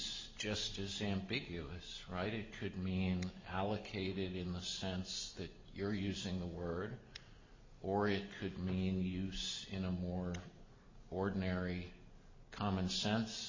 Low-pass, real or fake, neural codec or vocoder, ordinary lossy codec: 7.2 kHz; real; none; MP3, 32 kbps